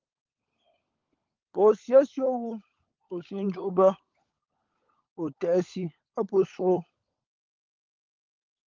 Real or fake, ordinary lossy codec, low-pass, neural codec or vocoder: fake; Opus, 32 kbps; 7.2 kHz; codec, 16 kHz, 16 kbps, FunCodec, trained on LibriTTS, 50 frames a second